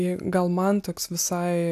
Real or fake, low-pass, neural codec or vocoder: real; 14.4 kHz; none